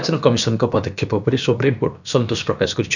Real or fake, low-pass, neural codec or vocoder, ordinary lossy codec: fake; 7.2 kHz; codec, 16 kHz, about 1 kbps, DyCAST, with the encoder's durations; none